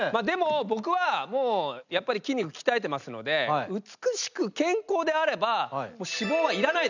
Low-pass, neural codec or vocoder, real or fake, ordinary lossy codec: 7.2 kHz; none; real; none